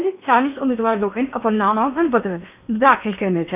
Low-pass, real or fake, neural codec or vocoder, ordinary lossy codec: 3.6 kHz; fake; codec, 16 kHz in and 24 kHz out, 0.8 kbps, FocalCodec, streaming, 65536 codes; none